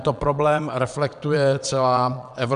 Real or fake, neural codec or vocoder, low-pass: fake; vocoder, 22.05 kHz, 80 mel bands, Vocos; 9.9 kHz